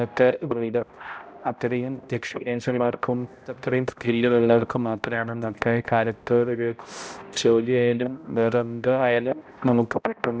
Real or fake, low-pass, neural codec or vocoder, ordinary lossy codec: fake; none; codec, 16 kHz, 0.5 kbps, X-Codec, HuBERT features, trained on balanced general audio; none